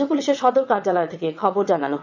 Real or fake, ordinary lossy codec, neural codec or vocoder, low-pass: fake; none; vocoder, 22.05 kHz, 80 mel bands, WaveNeXt; 7.2 kHz